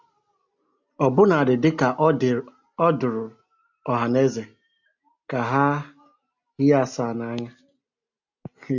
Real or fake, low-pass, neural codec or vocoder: real; 7.2 kHz; none